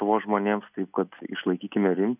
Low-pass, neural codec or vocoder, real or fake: 3.6 kHz; none; real